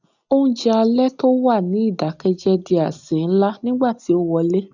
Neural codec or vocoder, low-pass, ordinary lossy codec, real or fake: none; 7.2 kHz; none; real